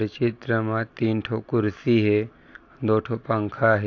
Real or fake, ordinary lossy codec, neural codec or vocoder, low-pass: real; AAC, 48 kbps; none; 7.2 kHz